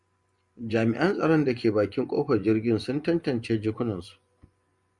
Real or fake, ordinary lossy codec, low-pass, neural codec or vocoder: fake; Opus, 64 kbps; 10.8 kHz; vocoder, 24 kHz, 100 mel bands, Vocos